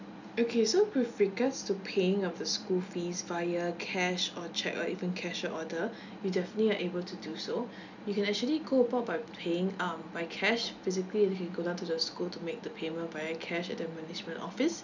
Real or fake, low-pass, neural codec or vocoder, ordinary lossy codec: real; 7.2 kHz; none; none